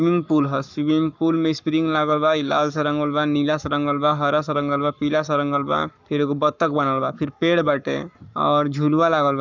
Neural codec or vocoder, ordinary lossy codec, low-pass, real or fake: codec, 44.1 kHz, 7.8 kbps, Pupu-Codec; none; 7.2 kHz; fake